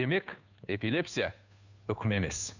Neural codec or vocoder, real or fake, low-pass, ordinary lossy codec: codec, 16 kHz, 2 kbps, FunCodec, trained on Chinese and English, 25 frames a second; fake; 7.2 kHz; none